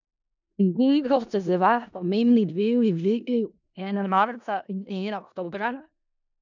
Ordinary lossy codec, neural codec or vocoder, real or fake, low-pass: none; codec, 16 kHz in and 24 kHz out, 0.4 kbps, LongCat-Audio-Codec, four codebook decoder; fake; 7.2 kHz